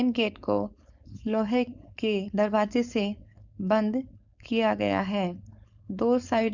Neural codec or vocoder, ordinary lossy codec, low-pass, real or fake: codec, 16 kHz, 4.8 kbps, FACodec; none; 7.2 kHz; fake